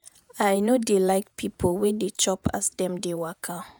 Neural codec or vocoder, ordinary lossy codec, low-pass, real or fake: vocoder, 48 kHz, 128 mel bands, Vocos; none; none; fake